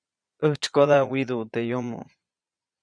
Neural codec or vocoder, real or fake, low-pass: vocoder, 22.05 kHz, 80 mel bands, Vocos; fake; 9.9 kHz